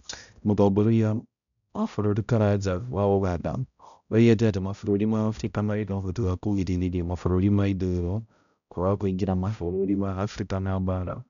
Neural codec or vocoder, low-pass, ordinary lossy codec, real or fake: codec, 16 kHz, 0.5 kbps, X-Codec, HuBERT features, trained on balanced general audio; 7.2 kHz; none; fake